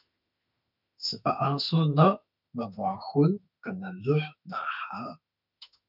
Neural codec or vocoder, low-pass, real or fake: autoencoder, 48 kHz, 32 numbers a frame, DAC-VAE, trained on Japanese speech; 5.4 kHz; fake